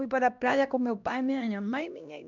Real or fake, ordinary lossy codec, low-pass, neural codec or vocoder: fake; none; 7.2 kHz; codec, 16 kHz, 1 kbps, X-Codec, HuBERT features, trained on LibriSpeech